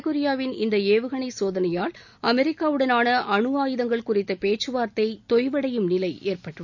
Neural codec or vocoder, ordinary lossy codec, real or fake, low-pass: none; none; real; 7.2 kHz